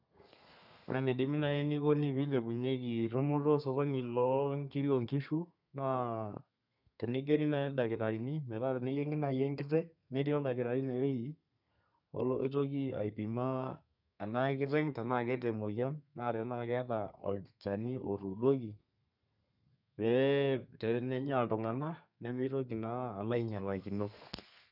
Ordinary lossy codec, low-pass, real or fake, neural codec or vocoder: none; 5.4 kHz; fake; codec, 32 kHz, 1.9 kbps, SNAC